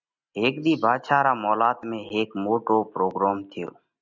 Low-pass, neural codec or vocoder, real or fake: 7.2 kHz; none; real